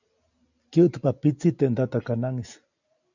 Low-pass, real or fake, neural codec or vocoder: 7.2 kHz; real; none